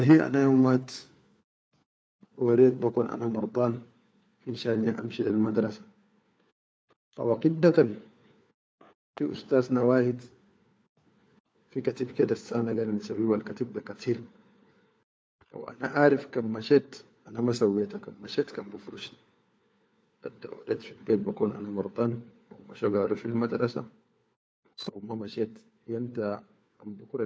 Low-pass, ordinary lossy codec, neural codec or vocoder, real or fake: none; none; codec, 16 kHz, 4 kbps, FunCodec, trained on LibriTTS, 50 frames a second; fake